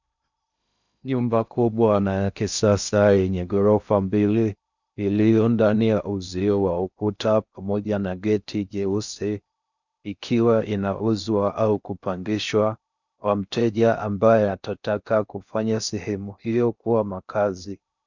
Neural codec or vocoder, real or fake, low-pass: codec, 16 kHz in and 24 kHz out, 0.6 kbps, FocalCodec, streaming, 2048 codes; fake; 7.2 kHz